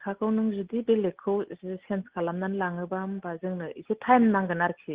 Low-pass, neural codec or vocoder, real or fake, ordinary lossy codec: 3.6 kHz; none; real; Opus, 16 kbps